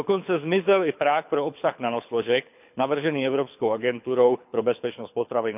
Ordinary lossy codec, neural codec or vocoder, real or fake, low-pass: none; codec, 24 kHz, 6 kbps, HILCodec; fake; 3.6 kHz